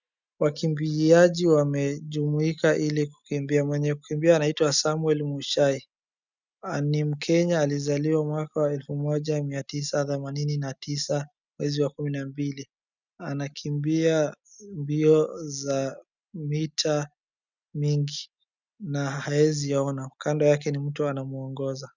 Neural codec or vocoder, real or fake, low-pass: none; real; 7.2 kHz